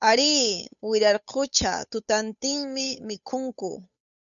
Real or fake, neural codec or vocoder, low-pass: fake; codec, 16 kHz, 8 kbps, FunCodec, trained on Chinese and English, 25 frames a second; 7.2 kHz